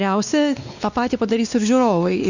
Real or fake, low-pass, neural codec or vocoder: fake; 7.2 kHz; codec, 16 kHz, 2 kbps, X-Codec, WavLM features, trained on Multilingual LibriSpeech